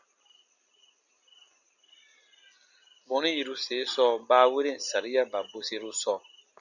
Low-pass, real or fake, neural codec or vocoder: 7.2 kHz; real; none